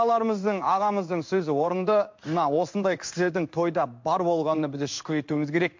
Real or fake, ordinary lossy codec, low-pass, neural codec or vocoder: fake; MP3, 48 kbps; 7.2 kHz; codec, 16 kHz in and 24 kHz out, 1 kbps, XY-Tokenizer